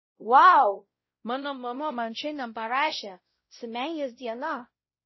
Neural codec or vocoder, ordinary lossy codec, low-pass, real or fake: codec, 16 kHz, 0.5 kbps, X-Codec, WavLM features, trained on Multilingual LibriSpeech; MP3, 24 kbps; 7.2 kHz; fake